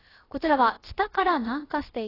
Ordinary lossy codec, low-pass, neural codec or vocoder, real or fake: AAC, 24 kbps; 5.4 kHz; codec, 16 kHz, about 1 kbps, DyCAST, with the encoder's durations; fake